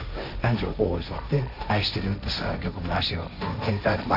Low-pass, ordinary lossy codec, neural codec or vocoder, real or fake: 5.4 kHz; none; codec, 16 kHz, 1.1 kbps, Voila-Tokenizer; fake